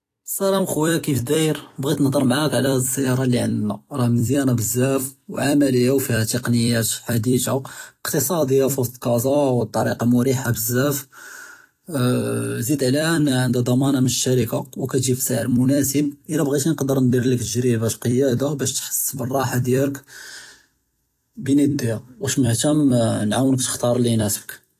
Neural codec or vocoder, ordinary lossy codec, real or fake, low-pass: vocoder, 44.1 kHz, 128 mel bands every 256 samples, BigVGAN v2; AAC, 48 kbps; fake; 14.4 kHz